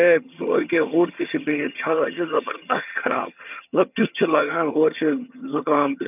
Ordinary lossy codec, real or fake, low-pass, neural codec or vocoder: none; fake; 3.6 kHz; vocoder, 22.05 kHz, 80 mel bands, HiFi-GAN